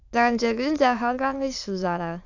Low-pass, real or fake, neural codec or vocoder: 7.2 kHz; fake; autoencoder, 22.05 kHz, a latent of 192 numbers a frame, VITS, trained on many speakers